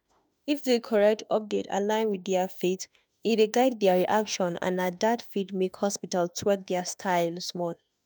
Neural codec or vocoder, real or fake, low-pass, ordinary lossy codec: autoencoder, 48 kHz, 32 numbers a frame, DAC-VAE, trained on Japanese speech; fake; none; none